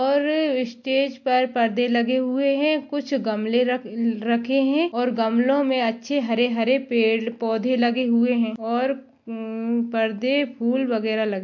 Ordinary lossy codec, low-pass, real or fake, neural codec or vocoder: MP3, 48 kbps; 7.2 kHz; real; none